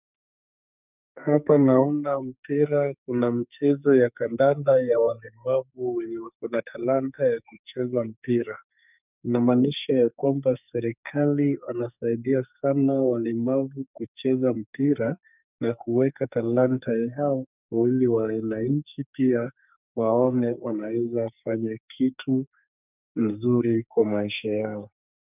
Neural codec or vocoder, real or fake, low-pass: codec, 44.1 kHz, 2.6 kbps, SNAC; fake; 3.6 kHz